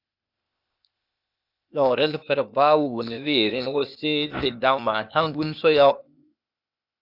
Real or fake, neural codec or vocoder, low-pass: fake; codec, 16 kHz, 0.8 kbps, ZipCodec; 5.4 kHz